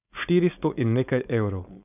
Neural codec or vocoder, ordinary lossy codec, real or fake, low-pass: codec, 16 kHz, 4.8 kbps, FACodec; none; fake; 3.6 kHz